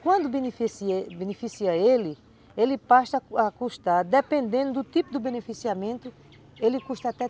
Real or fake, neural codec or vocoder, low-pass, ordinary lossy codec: real; none; none; none